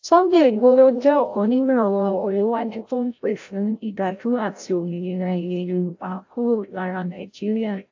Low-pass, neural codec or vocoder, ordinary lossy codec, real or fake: 7.2 kHz; codec, 16 kHz, 0.5 kbps, FreqCodec, larger model; AAC, 48 kbps; fake